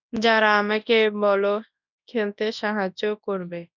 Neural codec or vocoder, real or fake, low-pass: codec, 24 kHz, 0.9 kbps, WavTokenizer, large speech release; fake; 7.2 kHz